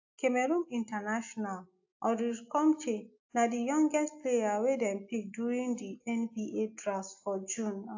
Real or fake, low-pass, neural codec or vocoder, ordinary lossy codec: real; 7.2 kHz; none; AAC, 48 kbps